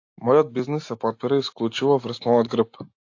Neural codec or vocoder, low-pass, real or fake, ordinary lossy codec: none; 7.2 kHz; real; AAC, 48 kbps